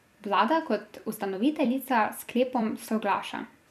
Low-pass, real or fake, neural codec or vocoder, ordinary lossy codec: 14.4 kHz; fake; vocoder, 44.1 kHz, 128 mel bands every 256 samples, BigVGAN v2; none